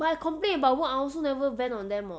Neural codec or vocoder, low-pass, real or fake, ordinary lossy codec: none; none; real; none